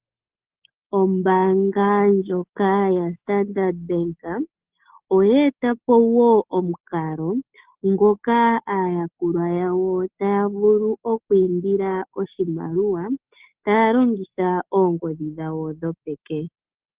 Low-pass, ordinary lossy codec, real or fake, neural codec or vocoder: 3.6 kHz; Opus, 16 kbps; real; none